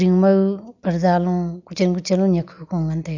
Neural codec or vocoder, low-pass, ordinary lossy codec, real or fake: none; 7.2 kHz; none; real